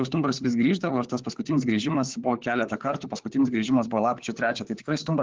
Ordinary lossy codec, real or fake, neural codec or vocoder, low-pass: Opus, 16 kbps; fake; codec, 16 kHz, 4 kbps, FunCodec, trained on LibriTTS, 50 frames a second; 7.2 kHz